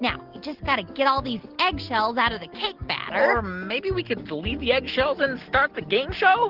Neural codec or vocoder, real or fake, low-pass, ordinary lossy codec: none; real; 5.4 kHz; Opus, 16 kbps